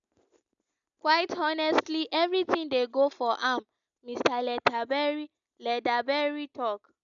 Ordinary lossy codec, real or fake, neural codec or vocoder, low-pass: none; real; none; 7.2 kHz